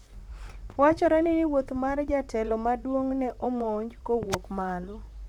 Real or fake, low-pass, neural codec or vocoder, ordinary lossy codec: fake; 19.8 kHz; vocoder, 44.1 kHz, 128 mel bands, Pupu-Vocoder; none